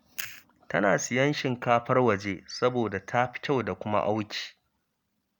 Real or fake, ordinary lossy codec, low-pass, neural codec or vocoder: real; none; none; none